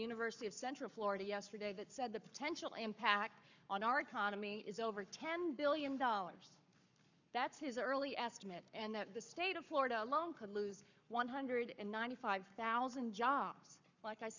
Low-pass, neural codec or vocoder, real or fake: 7.2 kHz; codec, 44.1 kHz, 7.8 kbps, Pupu-Codec; fake